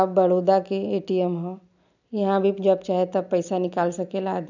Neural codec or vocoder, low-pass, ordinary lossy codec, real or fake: none; 7.2 kHz; none; real